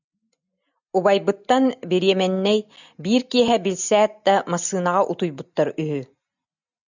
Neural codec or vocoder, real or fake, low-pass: none; real; 7.2 kHz